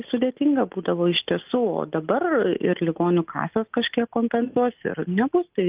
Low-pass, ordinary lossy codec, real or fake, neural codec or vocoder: 3.6 kHz; Opus, 32 kbps; real; none